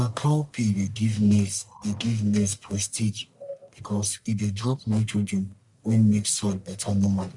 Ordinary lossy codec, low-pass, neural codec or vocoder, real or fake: none; 10.8 kHz; codec, 44.1 kHz, 1.7 kbps, Pupu-Codec; fake